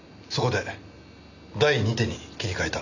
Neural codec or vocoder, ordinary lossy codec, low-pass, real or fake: none; none; 7.2 kHz; real